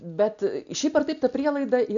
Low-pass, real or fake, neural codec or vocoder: 7.2 kHz; real; none